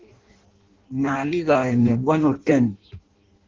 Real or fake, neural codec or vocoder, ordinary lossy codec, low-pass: fake; codec, 16 kHz in and 24 kHz out, 0.6 kbps, FireRedTTS-2 codec; Opus, 16 kbps; 7.2 kHz